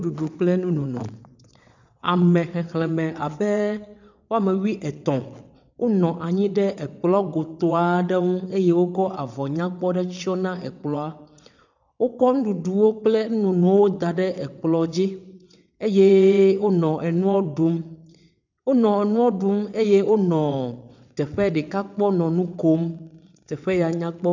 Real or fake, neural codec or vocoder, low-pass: fake; vocoder, 22.05 kHz, 80 mel bands, WaveNeXt; 7.2 kHz